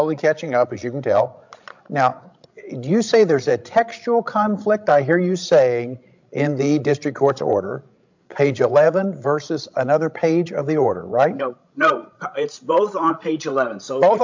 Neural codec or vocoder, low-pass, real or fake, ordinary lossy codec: codec, 16 kHz, 16 kbps, FreqCodec, larger model; 7.2 kHz; fake; MP3, 64 kbps